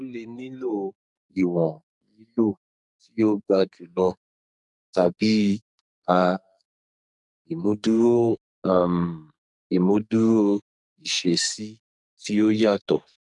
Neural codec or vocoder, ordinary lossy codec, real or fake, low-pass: codec, 44.1 kHz, 2.6 kbps, SNAC; none; fake; 10.8 kHz